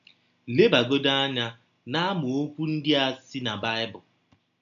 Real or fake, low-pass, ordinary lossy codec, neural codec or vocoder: real; 7.2 kHz; none; none